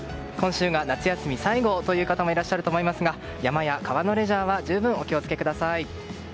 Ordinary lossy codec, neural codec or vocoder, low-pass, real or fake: none; none; none; real